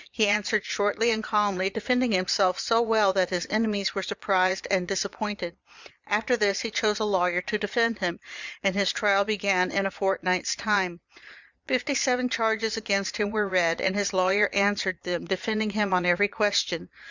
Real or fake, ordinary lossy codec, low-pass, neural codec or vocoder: fake; Opus, 64 kbps; 7.2 kHz; vocoder, 22.05 kHz, 80 mel bands, Vocos